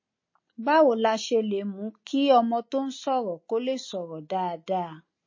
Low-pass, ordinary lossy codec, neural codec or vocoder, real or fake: 7.2 kHz; MP3, 32 kbps; none; real